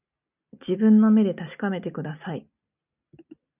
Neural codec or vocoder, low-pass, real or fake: none; 3.6 kHz; real